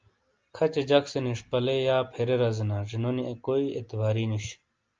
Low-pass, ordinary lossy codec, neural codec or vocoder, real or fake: 7.2 kHz; Opus, 24 kbps; none; real